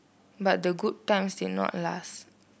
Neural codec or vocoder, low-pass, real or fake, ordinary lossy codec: none; none; real; none